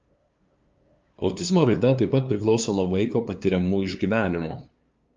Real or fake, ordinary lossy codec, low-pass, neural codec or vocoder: fake; Opus, 24 kbps; 7.2 kHz; codec, 16 kHz, 2 kbps, FunCodec, trained on LibriTTS, 25 frames a second